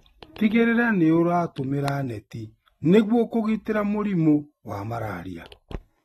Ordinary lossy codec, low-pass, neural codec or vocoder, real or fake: AAC, 32 kbps; 19.8 kHz; none; real